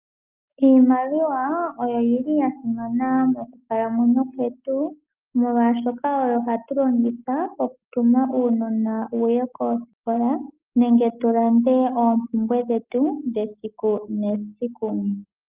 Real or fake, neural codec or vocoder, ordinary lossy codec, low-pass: real; none; Opus, 24 kbps; 3.6 kHz